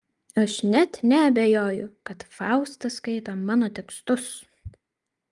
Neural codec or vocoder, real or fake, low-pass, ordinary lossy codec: none; real; 10.8 kHz; Opus, 32 kbps